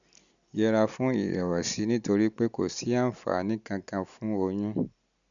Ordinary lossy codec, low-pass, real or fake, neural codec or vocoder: none; 7.2 kHz; real; none